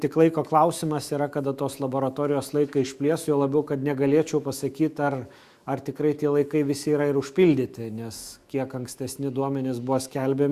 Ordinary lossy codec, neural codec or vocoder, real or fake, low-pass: Opus, 64 kbps; autoencoder, 48 kHz, 128 numbers a frame, DAC-VAE, trained on Japanese speech; fake; 14.4 kHz